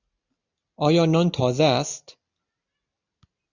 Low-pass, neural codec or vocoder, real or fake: 7.2 kHz; none; real